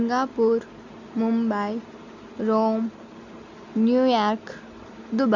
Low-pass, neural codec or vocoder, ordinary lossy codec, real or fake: 7.2 kHz; none; none; real